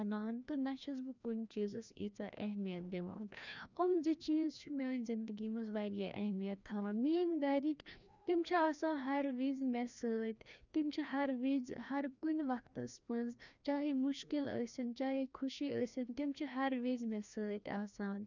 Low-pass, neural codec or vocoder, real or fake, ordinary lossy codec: 7.2 kHz; codec, 16 kHz, 1 kbps, FreqCodec, larger model; fake; none